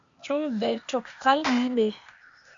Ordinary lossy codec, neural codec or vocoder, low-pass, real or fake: MP3, 64 kbps; codec, 16 kHz, 0.8 kbps, ZipCodec; 7.2 kHz; fake